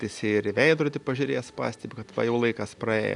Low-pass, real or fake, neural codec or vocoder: 10.8 kHz; real; none